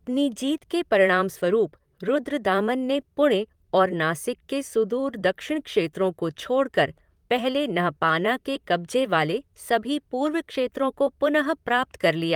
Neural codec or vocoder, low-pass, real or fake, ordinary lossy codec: vocoder, 44.1 kHz, 128 mel bands, Pupu-Vocoder; 19.8 kHz; fake; Opus, 32 kbps